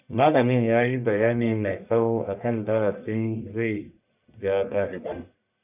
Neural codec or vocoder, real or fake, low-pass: codec, 44.1 kHz, 1.7 kbps, Pupu-Codec; fake; 3.6 kHz